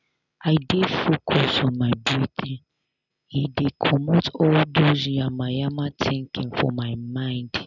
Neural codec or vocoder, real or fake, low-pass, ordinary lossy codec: none; real; 7.2 kHz; none